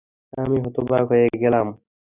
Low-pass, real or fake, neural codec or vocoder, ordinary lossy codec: 3.6 kHz; real; none; Opus, 64 kbps